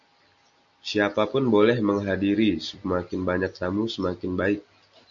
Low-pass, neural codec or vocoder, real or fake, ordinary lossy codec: 7.2 kHz; none; real; MP3, 64 kbps